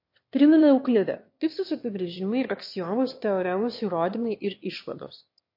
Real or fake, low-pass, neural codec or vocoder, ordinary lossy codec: fake; 5.4 kHz; autoencoder, 22.05 kHz, a latent of 192 numbers a frame, VITS, trained on one speaker; MP3, 32 kbps